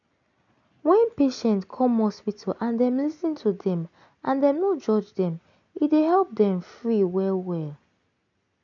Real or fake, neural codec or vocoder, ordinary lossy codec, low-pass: real; none; none; 7.2 kHz